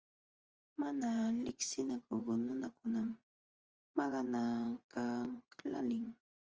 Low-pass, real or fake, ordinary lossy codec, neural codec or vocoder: 7.2 kHz; fake; Opus, 24 kbps; vocoder, 24 kHz, 100 mel bands, Vocos